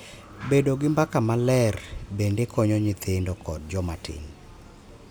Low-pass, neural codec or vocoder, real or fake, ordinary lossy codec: none; none; real; none